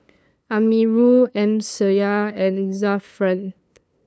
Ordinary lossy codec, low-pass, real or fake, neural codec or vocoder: none; none; fake; codec, 16 kHz, 2 kbps, FunCodec, trained on LibriTTS, 25 frames a second